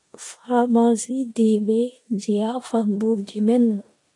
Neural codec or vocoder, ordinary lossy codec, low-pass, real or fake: codec, 16 kHz in and 24 kHz out, 0.9 kbps, LongCat-Audio-Codec, four codebook decoder; AAC, 48 kbps; 10.8 kHz; fake